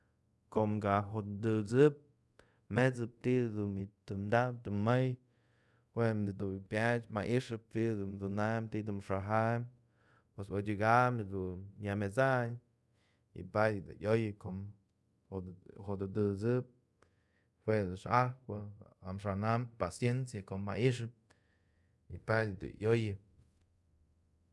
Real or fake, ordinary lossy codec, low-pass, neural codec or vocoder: fake; none; none; codec, 24 kHz, 0.5 kbps, DualCodec